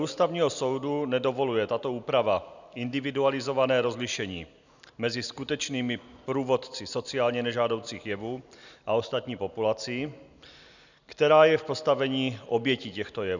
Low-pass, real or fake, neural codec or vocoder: 7.2 kHz; real; none